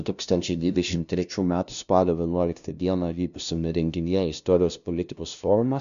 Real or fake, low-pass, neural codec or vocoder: fake; 7.2 kHz; codec, 16 kHz, 0.5 kbps, FunCodec, trained on LibriTTS, 25 frames a second